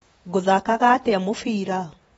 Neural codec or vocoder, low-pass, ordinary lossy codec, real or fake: vocoder, 48 kHz, 128 mel bands, Vocos; 19.8 kHz; AAC, 24 kbps; fake